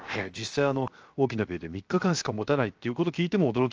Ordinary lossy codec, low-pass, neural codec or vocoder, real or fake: Opus, 24 kbps; 7.2 kHz; codec, 16 kHz, 0.7 kbps, FocalCodec; fake